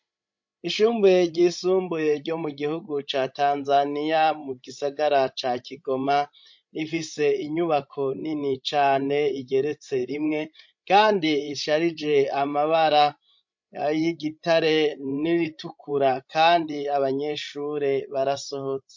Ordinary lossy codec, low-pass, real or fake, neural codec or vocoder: MP3, 48 kbps; 7.2 kHz; fake; codec, 16 kHz, 16 kbps, FreqCodec, larger model